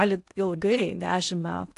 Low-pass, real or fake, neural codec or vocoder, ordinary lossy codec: 10.8 kHz; fake; codec, 16 kHz in and 24 kHz out, 0.8 kbps, FocalCodec, streaming, 65536 codes; AAC, 64 kbps